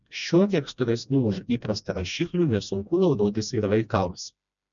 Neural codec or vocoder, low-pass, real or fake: codec, 16 kHz, 1 kbps, FreqCodec, smaller model; 7.2 kHz; fake